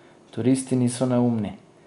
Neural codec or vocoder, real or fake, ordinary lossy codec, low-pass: none; real; MP3, 64 kbps; 10.8 kHz